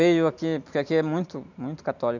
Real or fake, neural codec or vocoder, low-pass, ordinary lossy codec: real; none; 7.2 kHz; none